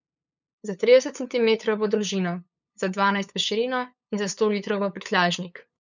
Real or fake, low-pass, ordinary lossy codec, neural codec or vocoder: fake; 7.2 kHz; none; codec, 16 kHz, 8 kbps, FunCodec, trained on LibriTTS, 25 frames a second